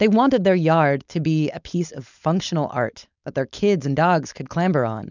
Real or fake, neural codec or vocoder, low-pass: fake; codec, 16 kHz, 4.8 kbps, FACodec; 7.2 kHz